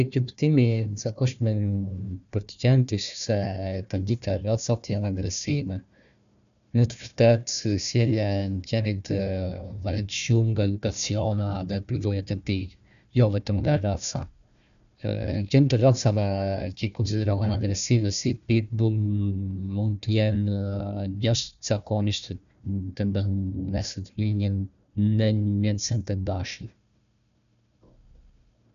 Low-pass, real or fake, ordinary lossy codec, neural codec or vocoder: 7.2 kHz; fake; none; codec, 16 kHz, 1 kbps, FunCodec, trained on Chinese and English, 50 frames a second